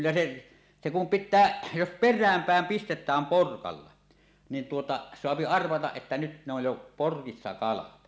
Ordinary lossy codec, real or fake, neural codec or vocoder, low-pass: none; real; none; none